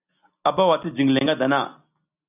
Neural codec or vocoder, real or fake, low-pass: none; real; 3.6 kHz